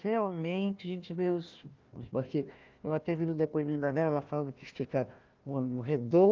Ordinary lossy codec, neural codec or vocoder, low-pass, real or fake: Opus, 24 kbps; codec, 16 kHz, 1 kbps, FunCodec, trained on Chinese and English, 50 frames a second; 7.2 kHz; fake